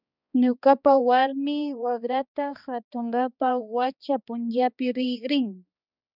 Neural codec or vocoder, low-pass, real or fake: codec, 16 kHz, 2 kbps, X-Codec, HuBERT features, trained on balanced general audio; 5.4 kHz; fake